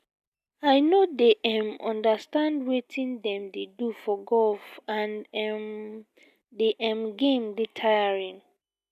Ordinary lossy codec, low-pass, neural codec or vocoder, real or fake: none; 14.4 kHz; none; real